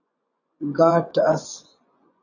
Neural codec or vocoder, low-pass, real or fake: vocoder, 24 kHz, 100 mel bands, Vocos; 7.2 kHz; fake